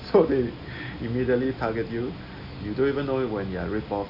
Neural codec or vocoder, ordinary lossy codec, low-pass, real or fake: none; none; 5.4 kHz; real